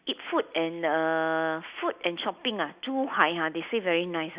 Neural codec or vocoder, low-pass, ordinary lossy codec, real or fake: none; 3.6 kHz; Opus, 64 kbps; real